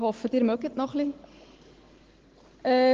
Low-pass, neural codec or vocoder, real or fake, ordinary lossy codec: 7.2 kHz; none; real; Opus, 16 kbps